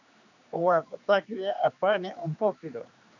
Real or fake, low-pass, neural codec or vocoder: fake; 7.2 kHz; codec, 16 kHz, 2 kbps, X-Codec, HuBERT features, trained on general audio